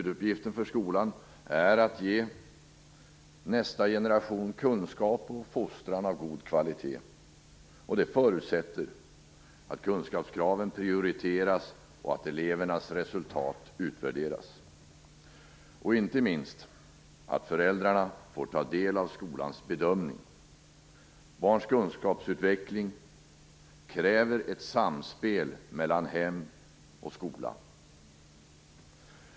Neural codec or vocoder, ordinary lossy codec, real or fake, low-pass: none; none; real; none